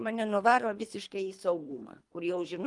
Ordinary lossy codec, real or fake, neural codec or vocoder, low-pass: Opus, 32 kbps; fake; codec, 24 kHz, 3 kbps, HILCodec; 10.8 kHz